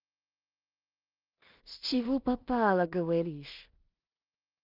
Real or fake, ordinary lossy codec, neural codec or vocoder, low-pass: fake; Opus, 24 kbps; codec, 16 kHz in and 24 kHz out, 0.4 kbps, LongCat-Audio-Codec, two codebook decoder; 5.4 kHz